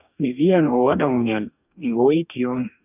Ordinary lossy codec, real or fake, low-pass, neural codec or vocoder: none; fake; 3.6 kHz; codec, 44.1 kHz, 2.6 kbps, DAC